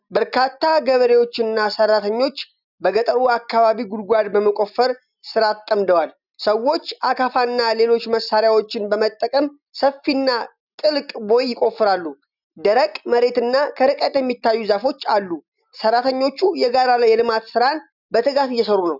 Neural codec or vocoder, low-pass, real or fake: none; 5.4 kHz; real